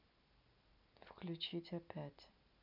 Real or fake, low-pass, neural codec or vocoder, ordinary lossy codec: real; 5.4 kHz; none; none